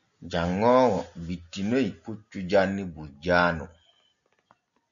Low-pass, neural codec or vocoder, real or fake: 7.2 kHz; none; real